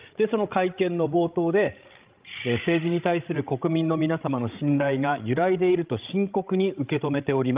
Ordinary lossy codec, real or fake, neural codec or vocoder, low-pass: Opus, 32 kbps; fake; codec, 16 kHz, 16 kbps, FreqCodec, larger model; 3.6 kHz